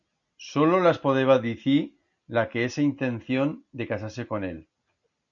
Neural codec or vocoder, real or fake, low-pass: none; real; 7.2 kHz